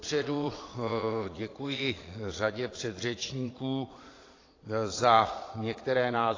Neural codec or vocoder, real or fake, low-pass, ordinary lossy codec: vocoder, 22.05 kHz, 80 mel bands, Vocos; fake; 7.2 kHz; AAC, 32 kbps